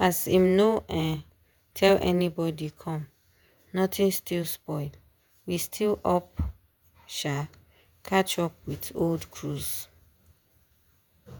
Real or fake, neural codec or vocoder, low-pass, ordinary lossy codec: fake; vocoder, 48 kHz, 128 mel bands, Vocos; none; none